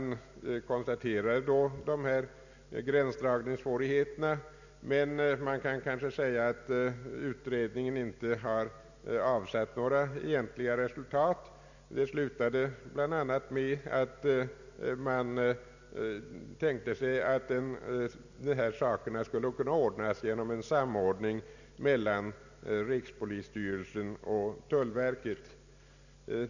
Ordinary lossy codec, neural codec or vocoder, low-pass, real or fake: none; none; 7.2 kHz; real